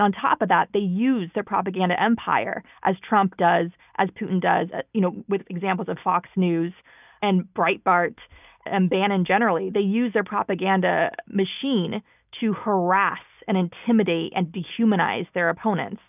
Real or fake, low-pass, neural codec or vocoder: real; 3.6 kHz; none